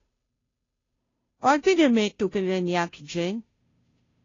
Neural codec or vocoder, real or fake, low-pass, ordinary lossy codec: codec, 16 kHz, 0.5 kbps, FunCodec, trained on Chinese and English, 25 frames a second; fake; 7.2 kHz; AAC, 32 kbps